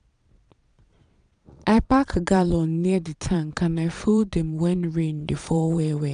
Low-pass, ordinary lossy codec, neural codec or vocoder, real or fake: 9.9 kHz; none; vocoder, 22.05 kHz, 80 mel bands, Vocos; fake